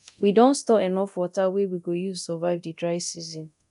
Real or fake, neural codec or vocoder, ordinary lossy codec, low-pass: fake; codec, 24 kHz, 0.9 kbps, DualCodec; none; 10.8 kHz